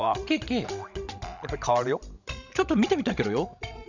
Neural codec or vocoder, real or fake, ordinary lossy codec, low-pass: codec, 16 kHz, 16 kbps, FreqCodec, larger model; fake; none; 7.2 kHz